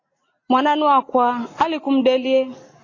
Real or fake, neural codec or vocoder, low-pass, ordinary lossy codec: real; none; 7.2 kHz; AAC, 32 kbps